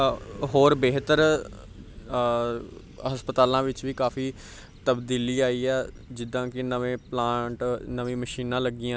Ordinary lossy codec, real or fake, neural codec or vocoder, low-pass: none; real; none; none